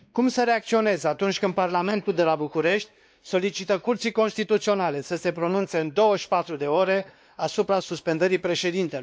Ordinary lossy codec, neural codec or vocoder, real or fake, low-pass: none; codec, 16 kHz, 2 kbps, X-Codec, WavLM features, trained on Multilingual LibriSpeech; fake; none